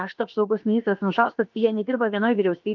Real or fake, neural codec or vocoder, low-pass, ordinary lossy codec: fake; codec, 16 kHz, about 1 kbps, DyCAST, with the encoder's durations; 7.2 kHz; Opus, 24 kbps